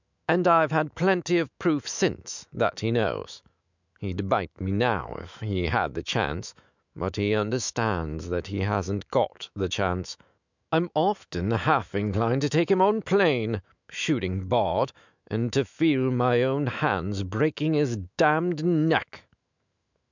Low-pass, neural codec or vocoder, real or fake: 7.2 kHz; autoencoder, 48 kHz, 128 numbers a frame, DAC-VAE, trained on Japanese speech; fake